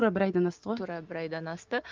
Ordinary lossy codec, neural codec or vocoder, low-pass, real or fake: Opus, 24 kbps; none; 7.2 kHz; real